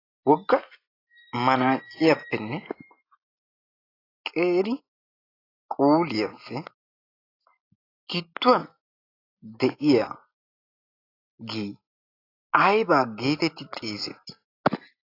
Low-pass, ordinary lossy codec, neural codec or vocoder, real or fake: 5.4 kHz; AAC, 24 kbps; none; real